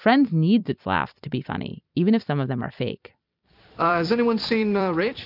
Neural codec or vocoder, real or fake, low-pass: vocoder, 44.1 kHz, 128 mel bands every 256 samples, BigVGAN v2; fake; 5.4 kHz